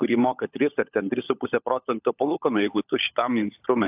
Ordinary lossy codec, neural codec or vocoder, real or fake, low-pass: AAC, 32 kbps; codec, 16 kHz, 16 kbps, FunCodec, trained on LibriTTS, 50 frames a second; fake; 3.6 kHz